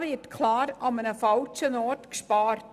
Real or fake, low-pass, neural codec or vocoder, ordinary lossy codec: real; 14.4 kHz; none; none